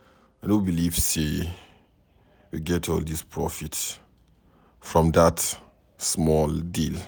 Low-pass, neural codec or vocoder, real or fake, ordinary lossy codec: none; none; real; none